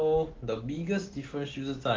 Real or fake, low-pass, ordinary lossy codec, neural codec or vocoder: real; 7.2 kHz; Opus, 16 kbps; none